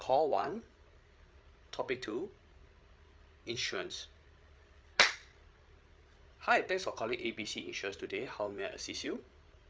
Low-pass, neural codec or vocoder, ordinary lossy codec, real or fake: none; codec, 16 kHz, 8 kbps, FreqCodec, larger model; none; fake